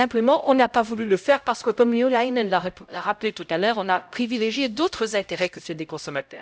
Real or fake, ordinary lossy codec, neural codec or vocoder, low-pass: fake; none; codec, 16 kHz, 0.5 kbps, X-Codec, HuBERT features, trained on LibriSpeech; none